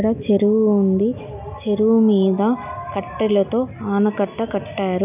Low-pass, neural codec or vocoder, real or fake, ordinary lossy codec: 3.6 kHz; none; real; none